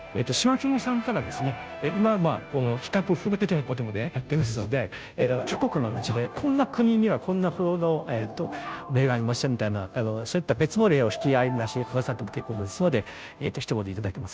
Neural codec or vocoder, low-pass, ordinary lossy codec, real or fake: codec, 16 kHz, 0.5 kbps, FunCodec, trained on Chinese and English, 25 frames a second; none; none; fake